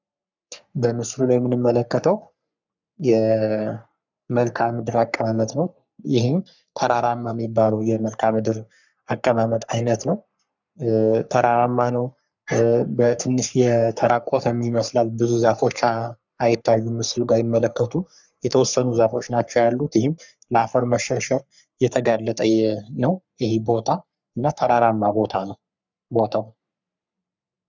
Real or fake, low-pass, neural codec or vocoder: fake; 7.2 kHz; codec, 44.1 kHz, 3.4 kbps, Pupu-Codec